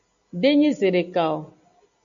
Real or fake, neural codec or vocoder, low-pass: real; none; 7.2 kHz